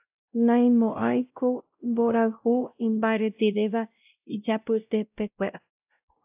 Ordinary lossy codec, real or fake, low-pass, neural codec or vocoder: AAC, 32 kbps; fake; 3.6 kHz; codec, 16 kHz, 0.5 kbps, X-Codec, WavLM features, trained on Multilingual LibriSpeech